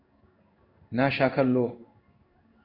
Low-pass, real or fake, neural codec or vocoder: 5.4 kHz; fake; codec, 16 kHz in and 24 kHz out, 1 kbps, XY-Tokenizer